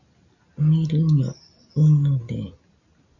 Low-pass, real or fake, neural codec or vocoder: 7.2 kHz; real; none